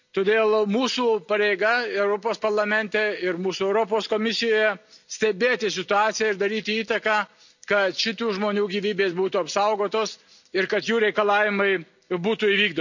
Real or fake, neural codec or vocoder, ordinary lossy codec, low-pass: real; none; none; 7.2 kHz